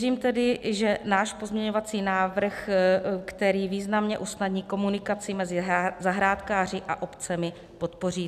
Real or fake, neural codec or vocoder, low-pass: real; none; 14.4 kHz